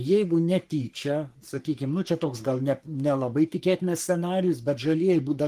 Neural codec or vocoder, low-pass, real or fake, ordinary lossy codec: codec, 44.1 kHz, 3.4 kbps, Pupu-Codec; 14.4 kHz; fake; Opus, 24 kbps